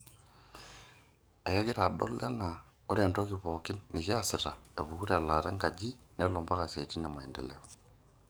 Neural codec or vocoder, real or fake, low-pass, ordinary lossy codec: codec, 44.1 kHz, 7.8 kbps, DAC; fake; none; none